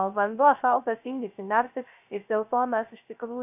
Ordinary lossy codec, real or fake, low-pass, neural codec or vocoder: AAC, 32 kbps; fake; 3.6 kHz; codec, 16 kHz, 0.3 kbps, FocalCodec